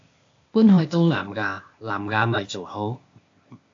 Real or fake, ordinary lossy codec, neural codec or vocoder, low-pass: fake; AAC, 48 kbps; codec, 16 kHz, 0.8 kbps, ZipCodec; 7.2 kHz